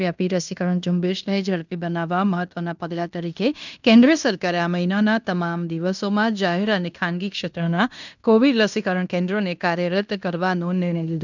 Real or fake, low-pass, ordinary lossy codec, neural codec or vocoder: fake; 7.2 kHz; none; codec, 16 kHz in and 24 kHz out, 0.9 kbps, LongCat-Audio-Codec, fine tuned four codebook decoder